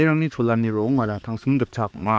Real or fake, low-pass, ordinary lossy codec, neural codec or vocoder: fake; none; none; codec, 16 kHz, 2 kbps, X-Codec, HuBERT features, trained on balanced general audio